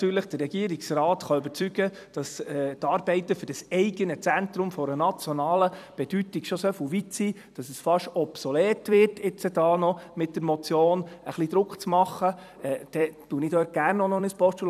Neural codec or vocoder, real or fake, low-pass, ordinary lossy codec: vocoder, 44.1 kHz, 128 mel bands every 256 samples, BigVGAN v2; fake; 14.4 kHz; none